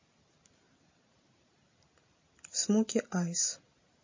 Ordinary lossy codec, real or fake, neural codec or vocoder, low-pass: MP3, 32 kbps; real; none; 7.2 kHz